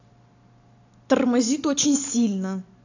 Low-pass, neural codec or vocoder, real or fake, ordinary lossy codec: 7.2 kHz; none; real; AAC, 48 kbps